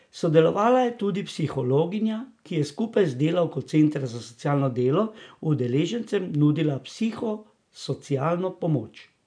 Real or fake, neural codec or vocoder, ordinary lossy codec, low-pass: real; none; none; 9.9 kHz